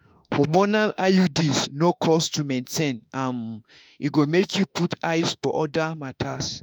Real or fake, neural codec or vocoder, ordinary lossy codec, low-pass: fake; autoencoder, 48 kHz, 32 numbers a frame, DAC-VAE, trained on Japanese speech; none; none